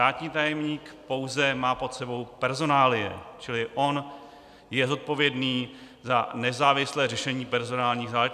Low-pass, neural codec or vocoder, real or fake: 14.4 kHz; none; real